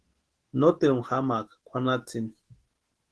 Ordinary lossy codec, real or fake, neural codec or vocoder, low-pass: Opus, 16 kbps; fake; autoencoder, 48 kHz, 128 numbers a frame, DAC-VAE, trained on Japanese speech; 10.8 kHz